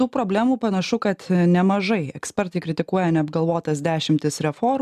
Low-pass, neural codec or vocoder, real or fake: 14.4 kHz; none; real